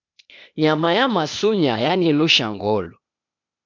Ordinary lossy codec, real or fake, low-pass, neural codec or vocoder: MP3, 64 kbps; fake; 7.2 kHz; codec, 16 kHz, 0.8 kbps, ZipCodec